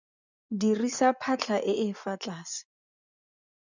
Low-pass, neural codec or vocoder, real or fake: 7.2 kHz; vocoder, 24 kHz, 100 mel bands, Vocos; fake